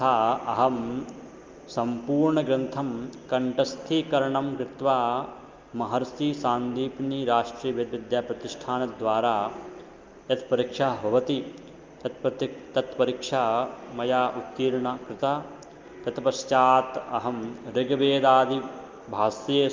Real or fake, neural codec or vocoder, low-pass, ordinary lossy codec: real; none; 7.2 kHz; Opus, 24 kbps